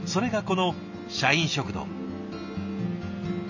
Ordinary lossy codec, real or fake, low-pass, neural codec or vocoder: none; real; 7.2 kHz; none